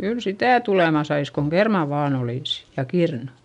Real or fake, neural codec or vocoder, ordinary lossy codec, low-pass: real; none; none; 10.8 kHz